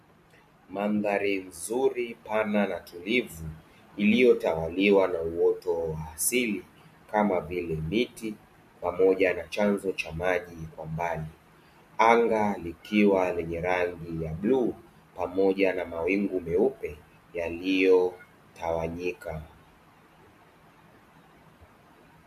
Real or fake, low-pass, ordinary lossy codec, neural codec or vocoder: real; 14.4 kHz; MP3, 64 kbps; none